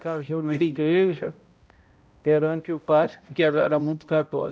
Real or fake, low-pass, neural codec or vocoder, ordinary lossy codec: fake; none; codec, 16 kHz, 0.5 kbps, X-Codec, HuBERT features, trained on balanced general audio; none